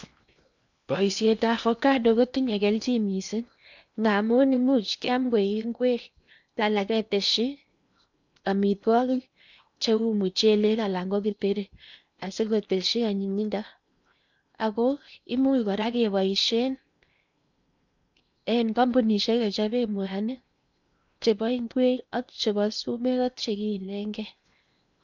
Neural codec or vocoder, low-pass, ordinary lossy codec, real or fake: codec, 16 kHz in and 24 kHz out, 0.8 kbps, FocalCodec, streaming, 65536 codes; 7.2 kHz; none; fake